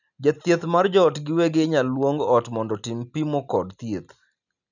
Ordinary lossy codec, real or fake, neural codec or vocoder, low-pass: none; real; none; 7.2 kHz